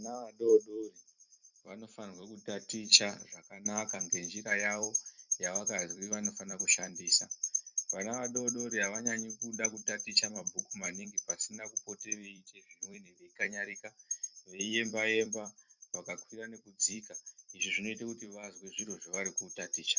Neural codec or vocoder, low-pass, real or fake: vocoder, 44.1 kHz, 128 mel bands every 256 samples, BigVGAN v2; 7.2 kHz; fake